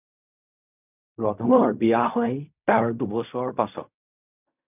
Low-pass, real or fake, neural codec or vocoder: 3.6 kHz; fake; codec, 16 kHz in and 24 kHz out, 0.4 kbps, LongCat-Audio-Codec, fine tuned four codebook decoder